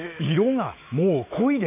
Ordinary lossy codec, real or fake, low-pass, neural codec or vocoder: none; fake; 3.6 kHz; autoencoder, 48 kHz, 128 numbers a frame, DAC-VAE, trained on Japanese speech